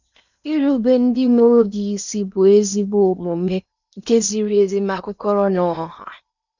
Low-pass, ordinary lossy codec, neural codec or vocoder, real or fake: 7.2 kHz; none; codec, 16 kHz in and 24 kHz out, 0.8 kbps, FocalCodec, streaming, 65536 codes; fake